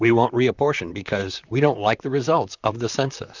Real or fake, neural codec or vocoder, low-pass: fake; vocoder, 44.1 kHz, 128 mel bands, Pupu-Vocoder; 7.2 kHz